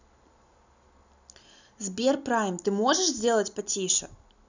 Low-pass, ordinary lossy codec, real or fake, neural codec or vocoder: 7.2 kHz; none; real; none